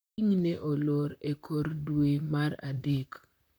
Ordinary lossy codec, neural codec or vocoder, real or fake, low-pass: none; vocoder, 44.1 kHz, 128 mel bands, Pupu-Vocoder; fake; none